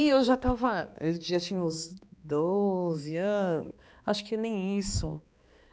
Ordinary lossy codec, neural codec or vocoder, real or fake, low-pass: none; codec, 16 kHz, 2 kbps, X-Codec, HuBERT features, trained on balanced general audio; fake; none